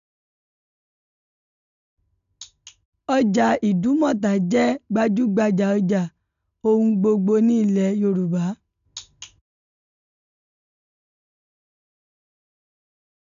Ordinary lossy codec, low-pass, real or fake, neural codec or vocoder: none; 7.2 kHz; real; none